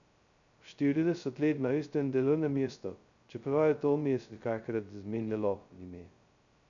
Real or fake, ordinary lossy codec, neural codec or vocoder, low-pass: fake; none; codec, 16 kHz, 0.2 kbps, FocalCodec; 7.2 kHz